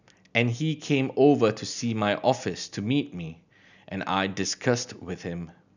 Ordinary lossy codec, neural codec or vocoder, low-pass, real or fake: none; none; 7.2 kHz; real